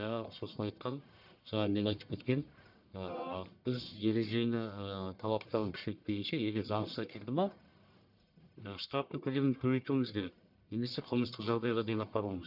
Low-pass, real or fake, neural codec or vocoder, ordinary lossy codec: 5.4 kHz; fake; codec, 44.1 kHz, 1.7 kbps, Pupu-Codec; none